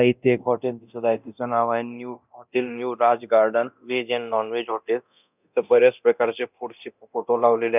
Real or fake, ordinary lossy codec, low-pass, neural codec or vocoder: fake; none; 3.6 kHz; codec, 24 kHz, 0.9 kbps, DualCodec